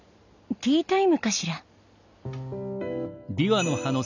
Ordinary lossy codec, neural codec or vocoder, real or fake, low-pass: none; none; real; 7.2 kHz